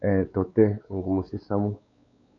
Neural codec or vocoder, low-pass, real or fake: codec, 16 kHz, 4 kbps, X-Codec, WavLM features, trained on Multilingual LibriSpeech; 7.2 kHz; fake